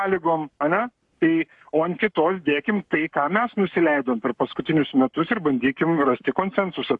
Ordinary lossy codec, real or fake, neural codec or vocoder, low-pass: AAC, 64 kbps; fake; codec, 44.1 kHz, 7.8 kbps, Pupu-Codec; 10.8 kHz